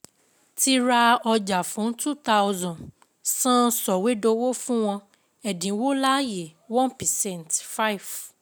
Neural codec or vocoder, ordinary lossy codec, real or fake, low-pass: none; none; real; none